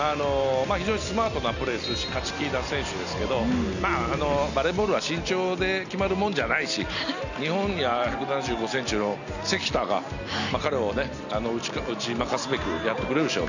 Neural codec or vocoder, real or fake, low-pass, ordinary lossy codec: none; real; 7.2 kHz; none